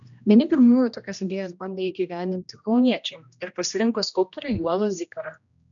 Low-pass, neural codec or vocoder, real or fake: 7.2 kHz; codec, 16 kHz, 1 kbps, X-Codec, HuBERT features, trained on general audio; fake